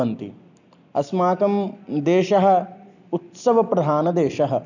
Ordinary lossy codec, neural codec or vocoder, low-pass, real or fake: none; none; 7.2 kHz; real